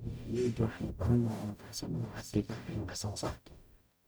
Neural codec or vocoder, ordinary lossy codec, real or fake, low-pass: codec, 44.1 kHz, 0.9 kbps, DAC; none; fake; none